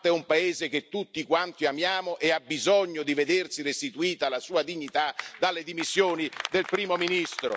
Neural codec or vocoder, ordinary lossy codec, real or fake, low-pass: none; none; real; none